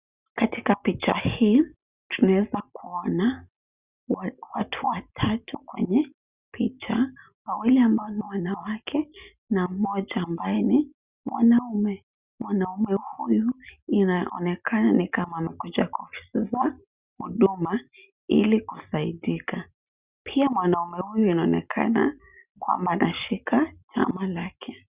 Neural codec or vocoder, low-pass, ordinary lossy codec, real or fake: none; 3.6 kHz; Opus, 64 kbps; real